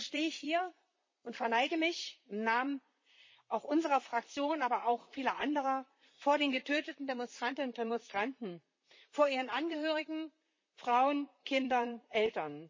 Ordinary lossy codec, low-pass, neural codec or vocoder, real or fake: MP3, 32 kbps; 7.2 kHz; vocoder, 44.1 kHz, 128 mel bands, Pupu-Vocoder; fake